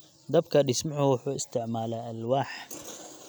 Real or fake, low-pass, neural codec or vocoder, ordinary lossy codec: real; none; none; none